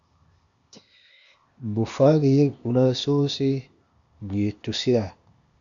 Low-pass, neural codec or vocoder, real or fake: 7.2 kHz; codec, 16 kHz, 0.8 kbps, ZipCodec; fake